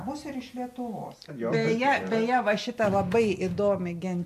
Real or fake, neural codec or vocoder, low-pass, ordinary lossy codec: real; none; 14.4 kHz; MP3, 64 kbps